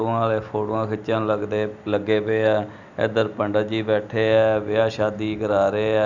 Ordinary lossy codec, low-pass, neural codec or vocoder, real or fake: none; 7.2 kHz; none; real